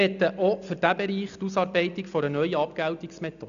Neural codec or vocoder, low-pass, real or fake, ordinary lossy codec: none; 7.2 kHz; real; AAC, 96 kbps